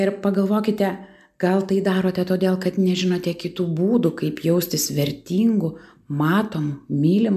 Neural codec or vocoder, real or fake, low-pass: none; real; 14.4 kHz